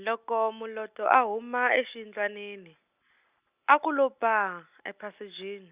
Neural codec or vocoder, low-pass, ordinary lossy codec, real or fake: none; 3.6 kHz; Opus, 64 kbps; real